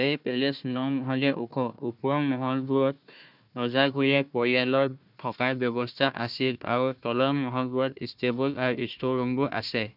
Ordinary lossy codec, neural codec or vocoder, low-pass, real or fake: none; codec, 16 kHz, 1 kbps, FunCodec, trained on Chinese and English, 50 frames a second; 5.4 kHz; fake